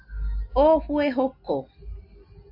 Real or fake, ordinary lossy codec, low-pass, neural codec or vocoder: real; AAC, 48 kbps; 5.4 kHz; none